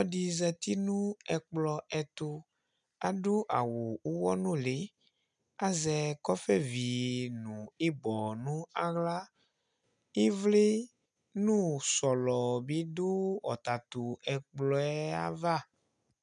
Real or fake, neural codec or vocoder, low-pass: real; none; 9.9 kHz